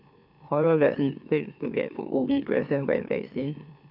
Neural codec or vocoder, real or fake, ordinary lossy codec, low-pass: autoencoder, 44.1 kHz, a latent of 192 numbers a frame, MeloTTS; fake; none; 5.4 kHz